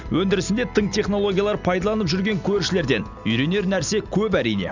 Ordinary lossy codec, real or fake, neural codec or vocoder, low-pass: none; real; none; 7.2 kHz